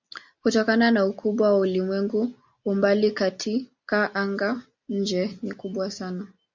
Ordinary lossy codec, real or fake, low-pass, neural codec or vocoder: MP3, 64 kbps; real; 7.2 kHz; none